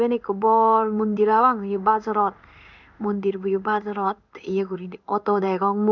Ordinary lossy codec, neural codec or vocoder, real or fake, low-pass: none; codec, 16 kHz in and 24 kHz out, 1 kbps, XY-Tokenizer; fake; 7.2 kHz